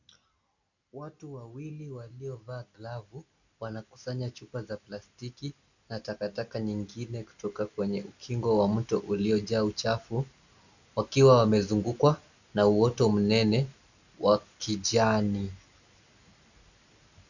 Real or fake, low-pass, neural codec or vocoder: real; 7.2 kHz; none